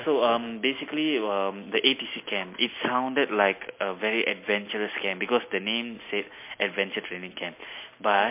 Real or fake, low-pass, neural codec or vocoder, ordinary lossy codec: real; 3.6 kHz; none; MP3, 24 kbps